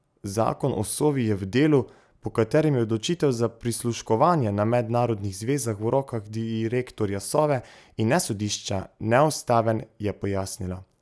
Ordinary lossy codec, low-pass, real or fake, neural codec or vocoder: none; none; real; none